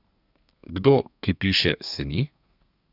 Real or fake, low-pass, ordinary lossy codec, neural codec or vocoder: fake; 5.4 kHz; none; codec, 32 kHz, 1.9 kbps, SNAC